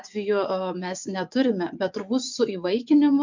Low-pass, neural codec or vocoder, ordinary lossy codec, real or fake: 7.2 kHz; vocoder, 24 kHz, 100 mel bands, Vocos; MP3, 64 kbps; fake